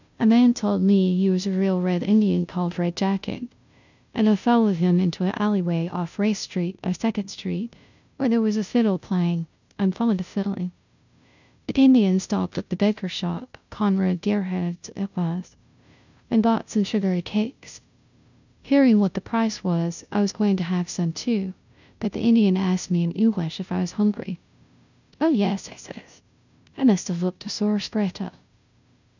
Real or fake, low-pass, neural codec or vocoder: fake; 7.2 kHz; codec, 16 kHz, 0.5 kbps, FunCodec, trained on Chinese and English, 25 frames a second